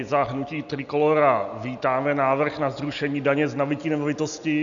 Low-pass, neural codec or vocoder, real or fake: 7.2 kHz; none; real